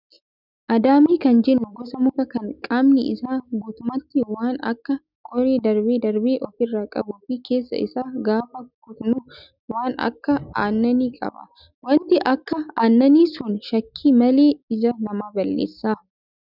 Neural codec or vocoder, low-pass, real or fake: none; 5.4 kHz; real